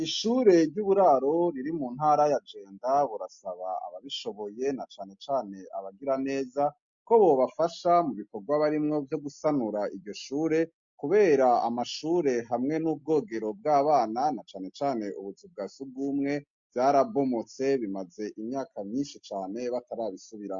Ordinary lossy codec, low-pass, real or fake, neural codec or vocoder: MP3, 48 kbps; 7.2 kHz; real; none